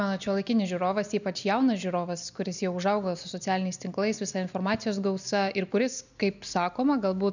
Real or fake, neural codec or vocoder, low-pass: real; none; 7.2 kHz